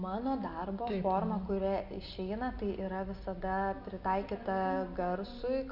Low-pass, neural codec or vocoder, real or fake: 5.4 kHz; none; real